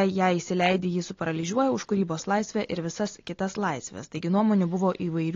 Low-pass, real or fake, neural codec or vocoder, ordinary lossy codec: 7.2 kHz; real; none; AAC, 32 kbps